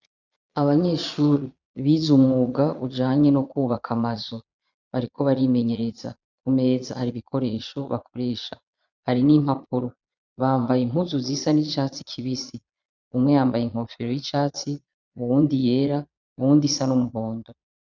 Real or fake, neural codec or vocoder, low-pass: fake; vocoder, 22.05 kHz, 80 mel bands, Vocos; 7.2 kHz